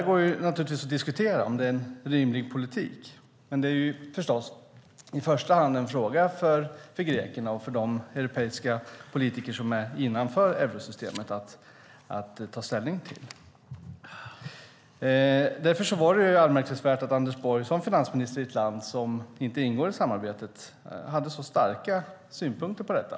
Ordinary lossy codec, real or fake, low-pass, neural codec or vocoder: none; real; none; none